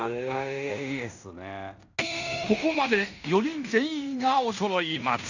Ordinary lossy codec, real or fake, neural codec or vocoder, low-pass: none; fake; codec, 16 kHz in and 24 kHz out, 0.9 kbps, LongCat-Audio-Codec, fine tuned four codebook decoder; 7.2 kHz